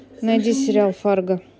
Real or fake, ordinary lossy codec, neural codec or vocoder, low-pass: real; none; none; none